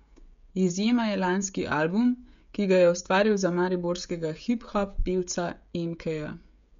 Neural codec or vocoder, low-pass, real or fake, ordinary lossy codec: codec, 16 kHz, 16 kbps, FreqCodec, smaller model; 7.2 kHz; fake; MP3, 64 kbps